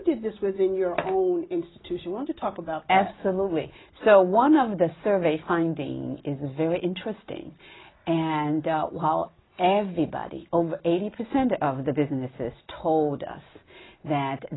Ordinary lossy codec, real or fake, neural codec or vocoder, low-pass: AAC, 16 kbps; real; none; 7.2 kHz